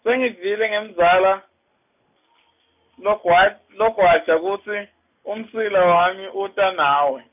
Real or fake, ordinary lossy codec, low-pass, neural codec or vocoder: real; none; 3.6 kHz; none